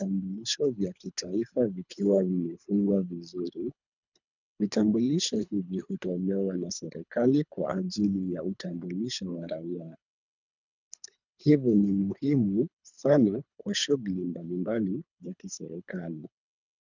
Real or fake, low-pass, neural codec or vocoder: fake; 7.2 kHz; codec, 24 kHz, 3 kbps, HILCodec